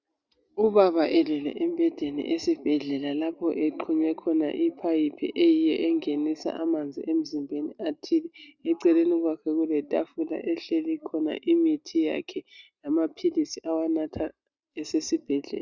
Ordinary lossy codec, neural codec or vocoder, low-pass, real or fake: Opus, 64 kbps; none; 7.2 kHz; real